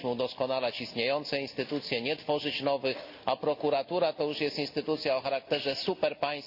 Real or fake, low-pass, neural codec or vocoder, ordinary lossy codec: real; 5.4 kHz; none; AAC, 48 kbps